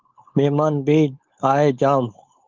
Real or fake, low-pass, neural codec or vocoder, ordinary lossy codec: fake; 7.2 kHz; codec, 16 kHz, 4.8 kbps, FACodec; Opus, 32 kbps